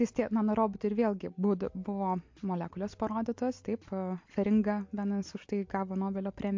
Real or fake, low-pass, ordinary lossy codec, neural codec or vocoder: real; 7.2 kHz; MP3, 48 kbps; none